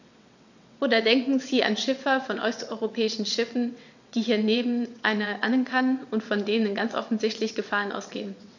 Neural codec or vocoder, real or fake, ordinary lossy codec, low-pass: vocoder, 22.05 kHz, 80 mel bands, WaveNeXt; fake; none; 7.2 kHz